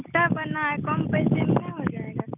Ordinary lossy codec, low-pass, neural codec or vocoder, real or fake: none; 3.6 kHz; none; real